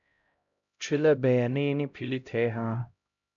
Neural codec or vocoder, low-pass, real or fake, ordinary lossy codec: codec, 16 kHz, 0.5 kbps, X-Codec, HuBERT features, trained on LibriSpeech; 7.2 kHz; fake; MP3, 64 kbps